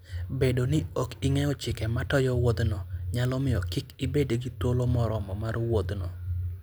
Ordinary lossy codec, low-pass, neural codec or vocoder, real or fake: none; none; none; real